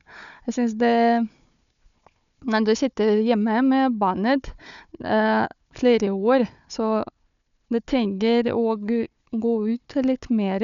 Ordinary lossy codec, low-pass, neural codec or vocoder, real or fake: none; 7.2 kHz; codec, 16 kHz, 4 kbps, FunCodec, trained on Chinese and English, 50 frames a second; fake